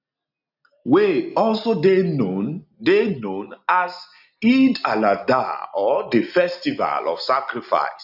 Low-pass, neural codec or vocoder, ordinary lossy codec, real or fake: 5.4 kHz; none; none; real